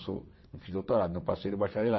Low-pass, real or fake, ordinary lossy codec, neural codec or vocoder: 7.2 kHz; fake; MP3, 24 kbps; vocoder, 22.05 kHz, 80 mel bands, WaveNeXt